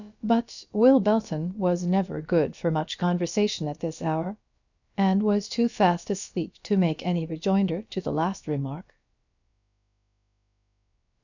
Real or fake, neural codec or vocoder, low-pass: fake; codec, 16 kHz, about 1 kbps, DyCAST, with the encoder's durations; 7.2 kHz